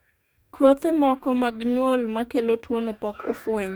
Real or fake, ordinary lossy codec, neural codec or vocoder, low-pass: fake; none; codec, 44.1 kHz, 2.6 kbps, DAC; none